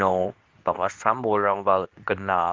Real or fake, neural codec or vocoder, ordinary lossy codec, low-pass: fake; codec, 24 kHz, 0.9 kbps, WavTokenizer, medium speech release version 2; Opus, 32 kbps; 7.2 kHz